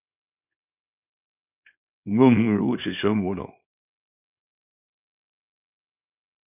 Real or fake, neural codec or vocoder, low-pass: fake; codec, 24 kHz, 0.9 kbps, WavTokenizer, small release; 3.6 kHz